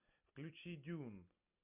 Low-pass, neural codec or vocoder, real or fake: 3.6 kHz; none; real